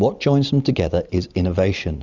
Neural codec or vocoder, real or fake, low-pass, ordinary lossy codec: vocoder, 44.1 kHz, 128 mel bands every 256 samples, BigVGAN v2; fake; 7.2 kHz; Opus, 64 kbps